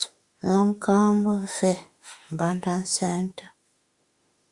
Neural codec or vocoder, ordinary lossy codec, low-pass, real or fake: autoencoder, 48 kHz, 32 numbers a frame, DAC-VAE, trained on Japanese speech; Opus, 64 kbps; 10.8 kHz; fake